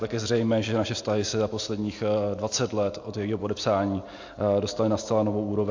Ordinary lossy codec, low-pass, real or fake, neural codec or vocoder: AAC, 48 kbps; 7.2 kHz; real; none